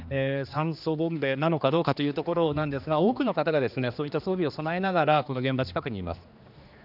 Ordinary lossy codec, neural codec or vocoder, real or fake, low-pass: none; codec, 16 kHz, 2 kbps, X-Codec, HuBERT features, trained on general audio; fake; 5.4 kHz